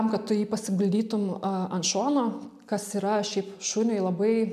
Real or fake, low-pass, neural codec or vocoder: real; 14.4 kHz; none